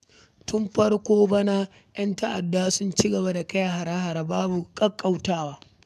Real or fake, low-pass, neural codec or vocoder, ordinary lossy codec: fake; 14.4 kHz; codec, 44.1 kHz, 7.8 kbps, DAC; none